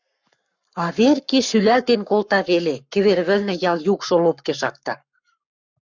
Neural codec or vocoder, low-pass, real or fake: codec, 44.1 kHz, 7.8 kbps, Pupu-Codec; 7.2 kHz; fake